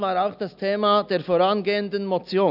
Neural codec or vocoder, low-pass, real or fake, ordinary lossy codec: none; 5.4 kHz; real; none